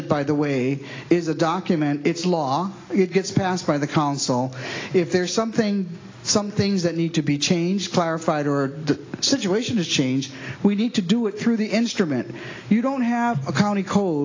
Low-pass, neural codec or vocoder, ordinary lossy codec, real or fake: 7.2 kHz; none; AAC, 32 kbps; real